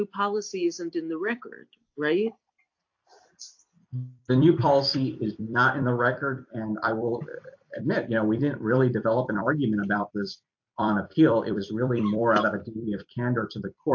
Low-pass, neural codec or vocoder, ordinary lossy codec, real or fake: 7.2 kHz; vocoder, 44.1 kHz, 128 mel bands every 256 samples, BigVGAN v2; AAC, 48 kbps; fake